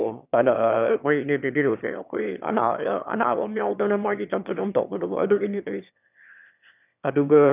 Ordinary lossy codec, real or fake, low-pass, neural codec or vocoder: none; fake; 3.6 kHz; autoencoder, 22.05 kHz, a latent of 192 numbers a frame, VITS, trained on one speaker